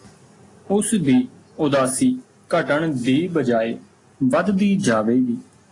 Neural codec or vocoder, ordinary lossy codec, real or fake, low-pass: none; AAC, 32 kbps; real; 10.8 kHz